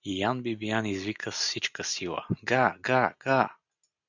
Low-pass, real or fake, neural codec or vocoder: 7.2 kHz; real; none